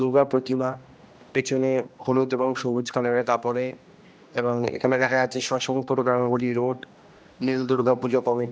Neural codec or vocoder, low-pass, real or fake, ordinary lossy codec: codec, 16 kHz, 1 kbps, X-Codec, HuBERT features, trained on general audio; none; fake; none